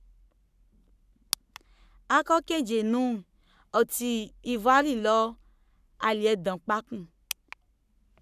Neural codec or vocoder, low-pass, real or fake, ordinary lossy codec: codec, 44.1 kHz, 7.8 kbps, Pupu-Codec; 14.4 kHz; fake; none